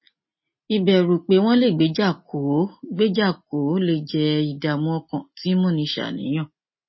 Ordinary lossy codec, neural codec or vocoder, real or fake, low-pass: MP3, 24 kbps; none; real; 7.2 kHz